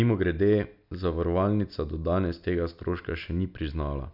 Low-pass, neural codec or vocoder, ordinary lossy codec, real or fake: 5.4 kHz; none; none; real